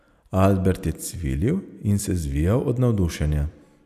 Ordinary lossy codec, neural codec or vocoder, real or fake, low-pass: none; none; real; 14.4 kHz